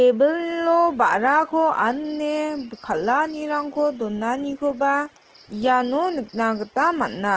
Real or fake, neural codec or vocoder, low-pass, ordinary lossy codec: real; none; 7.2 kHz; Opus, 16 kbps